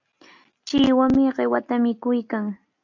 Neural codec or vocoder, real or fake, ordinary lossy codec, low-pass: none; real; AAC, 48 kbps; 7.2 kHz